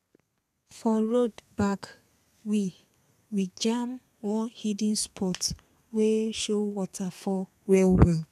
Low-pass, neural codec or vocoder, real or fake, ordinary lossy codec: 14.4 kHz; codec, 32 kHz, 1.9 kbps, SNAC; fake; none